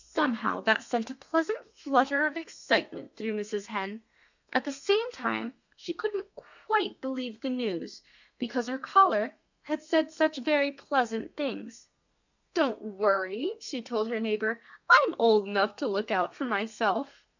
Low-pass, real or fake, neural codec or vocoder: 7.2 kHz; fake; codec, 32 kHz, 1.9 kbps, SNAC